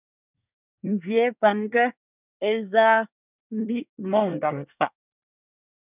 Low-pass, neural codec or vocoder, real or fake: 3.6 kHz; codec, 24 kHz, 1 kbps, SNAC; fake